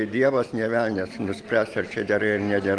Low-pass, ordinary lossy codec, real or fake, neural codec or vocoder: 9.9 kHz; Opus, 24 kbps; real; none